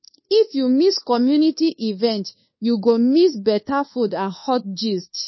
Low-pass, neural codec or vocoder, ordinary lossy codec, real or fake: 7.2 kHz; codec, 24 kHz, 1.2 kbps, DualCodec; MP3, 24 kbps; fake